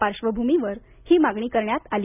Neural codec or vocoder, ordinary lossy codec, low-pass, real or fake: none; none; 3.6 kHz; real